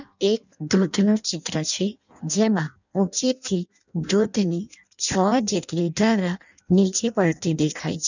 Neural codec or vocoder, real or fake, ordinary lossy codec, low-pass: codec, 16 kHz in and 24 kHz out, 0.6 kbps, FireRedTTS-2 codec; fake; none; 7.2 kHz